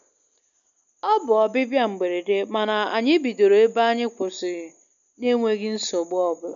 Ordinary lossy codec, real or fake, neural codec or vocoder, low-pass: none; real; none; 7.2 kHz